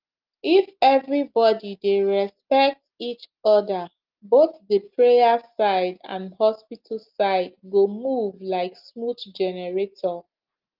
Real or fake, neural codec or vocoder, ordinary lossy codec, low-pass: real; none; Opus, 32 kbps; 5.4 kHz